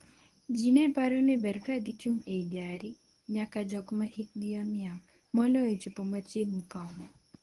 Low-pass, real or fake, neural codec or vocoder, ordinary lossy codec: 10.8 kHz; fake; codec, 24 kHz, 0.9 kbps, WavTokenizer, medium speech release version 1; Opus, 24 kbps